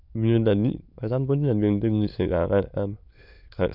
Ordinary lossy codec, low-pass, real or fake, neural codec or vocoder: none; 5.4 kHz; fake; autoencoder, 22.05 kHz, a latent of 192 numbers a frame, VITS, trained on many speakers